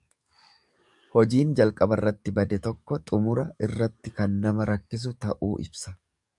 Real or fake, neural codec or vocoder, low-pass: fake; codec, 44.1 kHz, 7.8 kbps, DAC; 10.8 kHz